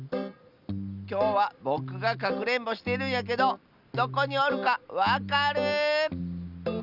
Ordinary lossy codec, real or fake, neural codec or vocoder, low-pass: none; real; none; 5.4 kHz